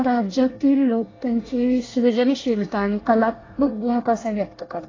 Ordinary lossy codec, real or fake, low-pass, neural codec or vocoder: AAC, 32 kbps; fake; 7.2 kHz; codec, 24 kHz, 1 kbps, SNAC